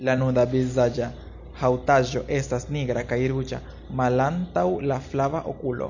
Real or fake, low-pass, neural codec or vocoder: real; 7.2 kHz; none